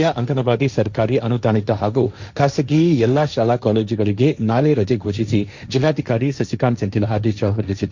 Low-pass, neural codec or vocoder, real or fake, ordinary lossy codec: 7.2 kHz; codec, 16 kHz, 1.1 kbps, Voila-Tokenizer; fake; Opus, 64 kbps